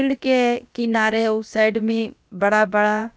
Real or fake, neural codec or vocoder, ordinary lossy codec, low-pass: fake; codec, 16 kHz, about 1 kbps, DyCAST, with the encoder's durations; none; none